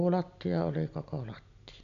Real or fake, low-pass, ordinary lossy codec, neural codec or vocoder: real; 7.2 kHz; AAC, 64 kbps; none